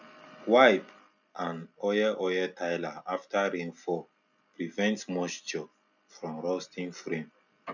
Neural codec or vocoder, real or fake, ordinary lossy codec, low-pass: none; real; none; 7.2 kHz